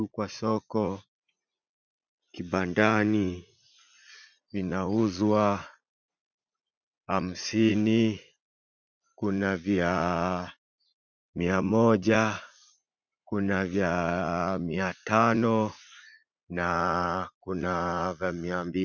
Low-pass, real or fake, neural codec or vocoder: 7.2 kHz; fake; vocoder, 44.1 kHz, 80 mel bands, Vocos